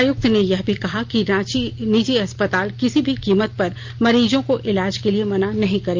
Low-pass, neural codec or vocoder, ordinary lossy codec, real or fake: 7.2 kHz; none; Opus, 24 kbps; real